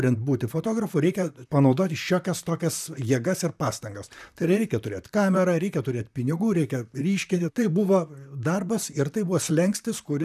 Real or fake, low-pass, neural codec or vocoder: fake; 14.4 kHz; vocoder, 44.1 kHz, 128 mel bands, Pupu-Vocoder